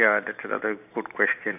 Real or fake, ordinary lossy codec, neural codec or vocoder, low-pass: fake; none; vocoder, 44.1 kHz, 128 mel bands every 512 samples, BigVGAN v2; 3.6 kHz